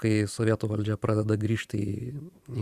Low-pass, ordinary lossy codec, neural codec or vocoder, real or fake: 14.4 kHz; Opus, 64 kbps; vocoder, 44.1 kHz, 128 mel bands every 512 samples, BigVGAN v2; fake